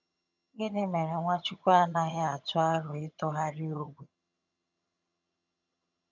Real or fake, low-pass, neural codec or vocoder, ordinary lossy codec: fake; 7.2 kHz; vocoder, 22.05 kHz, 80 mel bands, HiFi-GAN; none